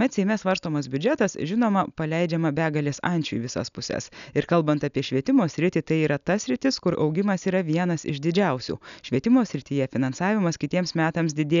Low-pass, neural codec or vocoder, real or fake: 7.2 kHz; none; real